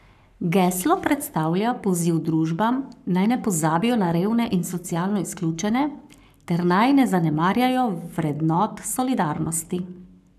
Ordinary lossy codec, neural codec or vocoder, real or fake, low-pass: none; codec, 44.1 kHz, 7.8 kbps, Pupu-Codec; fake; 14.4 kHz